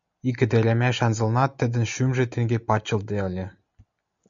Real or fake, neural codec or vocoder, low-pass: real; none; 7.2 kHz